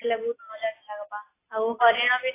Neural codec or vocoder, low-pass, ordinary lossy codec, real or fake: none; 3.6 kHz; AAC, 16 kbps; real